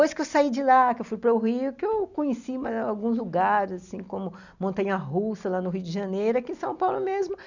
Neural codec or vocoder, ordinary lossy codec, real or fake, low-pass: none; none; real; 7.2 kHz